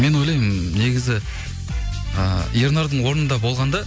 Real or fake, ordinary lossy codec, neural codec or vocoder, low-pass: real; none; none; none